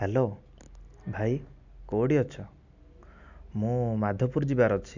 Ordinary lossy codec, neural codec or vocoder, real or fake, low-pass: none; none; real; 7.2 kHz